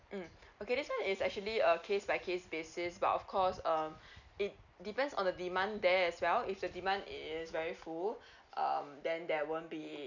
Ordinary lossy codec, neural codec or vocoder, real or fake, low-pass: none; none; real; 7.2 kHz